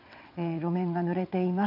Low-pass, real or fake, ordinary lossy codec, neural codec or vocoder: 5.4 kHz; fake; none; vocoder, 22.05 kHz, 80 mel bands, WaveNeXt